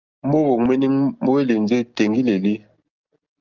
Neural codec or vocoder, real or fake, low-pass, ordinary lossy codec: none; real; 7.2 kHz; Opus, 24 kbps